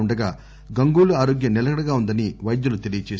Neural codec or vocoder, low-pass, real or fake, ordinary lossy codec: none; none; real; none